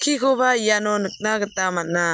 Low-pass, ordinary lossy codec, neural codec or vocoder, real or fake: none; none; none; real